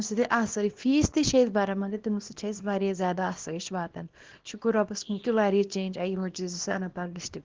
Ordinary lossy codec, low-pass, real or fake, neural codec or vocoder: Opus, 16 kbps; 7.2 kHz; fake; codec, 24 kHz, 0.9 kbps, WavTokenizer, small release